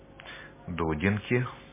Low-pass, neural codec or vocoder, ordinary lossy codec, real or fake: 3.6 kHz; none; MP3, 16 kbps; real